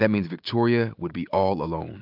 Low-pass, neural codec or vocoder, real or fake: 5.4 kHz; none; real